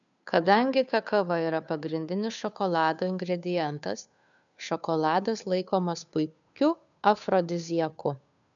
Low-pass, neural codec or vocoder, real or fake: 7.2 kHz; codec, 16 kHz, 2 kbps, FunCodec, trained on Chinese and English, 25 frames a second; fake